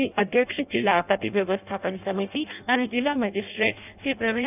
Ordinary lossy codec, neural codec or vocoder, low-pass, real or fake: none; codec, 16 kHz in and 24 kHz out, 0.6 kbps, FireRedTTS-2 codec; 3.6 kHz; fake